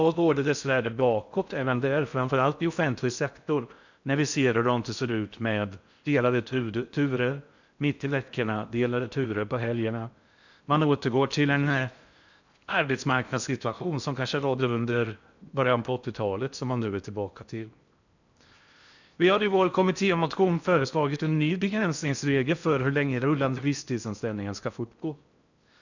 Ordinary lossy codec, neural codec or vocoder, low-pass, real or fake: none; codec, 16 kHz in and 24 kHz out, 0.6 kbps, FocalCodec, streaming, 4096 codes; 7.2 kHz; fake